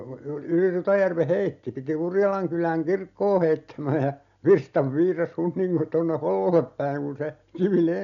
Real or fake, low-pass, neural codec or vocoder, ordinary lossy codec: real; 7.2 kHz; none; none